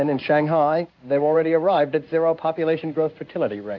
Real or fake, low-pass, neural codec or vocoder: fake; 7.2 kHz; codec, 16 kHz in and 24 kHz out, 1 kbps, XY-Tokenizer